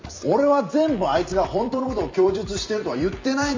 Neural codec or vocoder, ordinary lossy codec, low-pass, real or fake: vocoder, 44.1 kHz, 128 mel bands every 256 samples, BigVGAN v2; MP3, 64 kbps; 7.2 kHz; fake